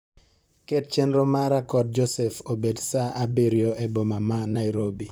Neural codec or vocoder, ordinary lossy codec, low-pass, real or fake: vocoder, 44.1 kHz, 128 mel bands, Pupu-Vocoder; none; none; fake